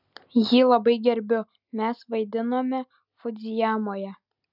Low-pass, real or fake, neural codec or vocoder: 5.4 kHz; real; none